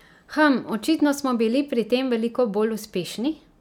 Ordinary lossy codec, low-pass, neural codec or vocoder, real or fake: none; 19.8 kHz; none; real